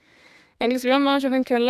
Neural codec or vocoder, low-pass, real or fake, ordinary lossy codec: codec, 32 kHz, 1.9 kbps, SNAC; 14.4 kHz; fake; none